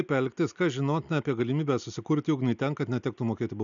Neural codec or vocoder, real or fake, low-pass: none; real; 7.2 kHz